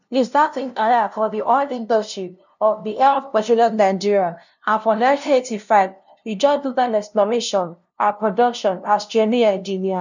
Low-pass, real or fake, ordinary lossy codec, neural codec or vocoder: 7.2 kHz; fake; none; codec, 16 kHz, 0.5 kbps, FunCodec, trained on LibriTTS, 25 frames a second